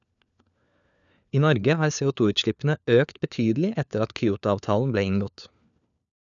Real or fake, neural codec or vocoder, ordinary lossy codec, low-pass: fake; codec, 16 kHz, 4 kbps, FunCodec, trained on LibriTTS, 50 frames a second; none; 7.2 kHz